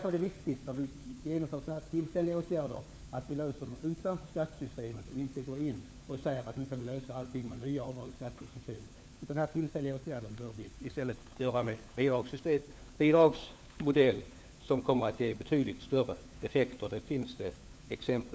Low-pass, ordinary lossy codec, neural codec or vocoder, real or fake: none; none; codec, 16 kHz, 4 kbps, FunCodec, trained on LibriTTS, 50 frames a second; fake